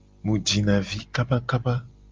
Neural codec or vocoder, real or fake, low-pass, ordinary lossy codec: none; real; 7.2 kHz; Opus, 24 kbps